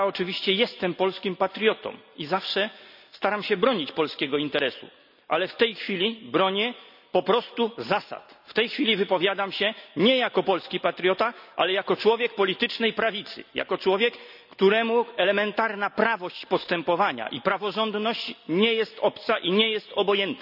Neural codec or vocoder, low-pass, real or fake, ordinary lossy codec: none; 5.4 kHz; real; none